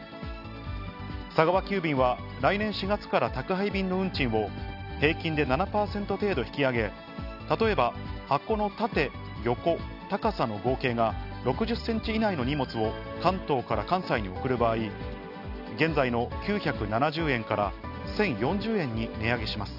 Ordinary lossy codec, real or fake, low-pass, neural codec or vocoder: none; real; 5.4 kHz; none